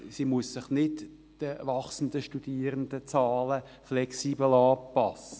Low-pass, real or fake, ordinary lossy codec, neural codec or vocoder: none; real; none; none